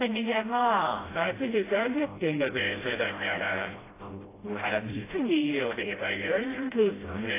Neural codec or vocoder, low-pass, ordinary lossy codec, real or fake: codec, 16 kHz, 0.5 kbps, FreqCodec, smaller model; 3.6 kHz; AAC, 16 kbps; fake